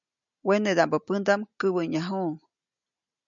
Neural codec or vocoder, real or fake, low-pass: none; real; 7.2 kHz